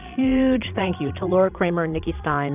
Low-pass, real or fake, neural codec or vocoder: 3.6 kHz; fake; vocoder, 22.05 kHz, 80 mel bands, Vocos